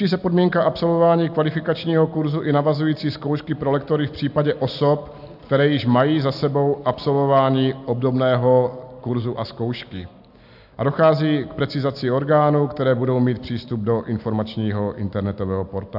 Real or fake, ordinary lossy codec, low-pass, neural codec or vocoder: real; AAC, 48 kbps; 5.4 kHz; none